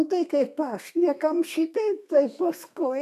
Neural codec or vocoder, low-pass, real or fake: codec, 32 kHz, 1.9 kbps, SNAC; 14.4 kHz; fake